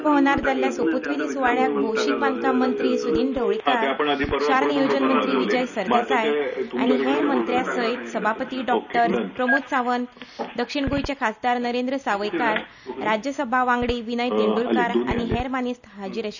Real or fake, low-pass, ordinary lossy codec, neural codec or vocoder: real; 7.2 kHz; none; none